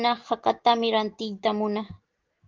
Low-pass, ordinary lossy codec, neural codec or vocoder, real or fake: 7.2 kHz; Opus, 16 kbps; none; real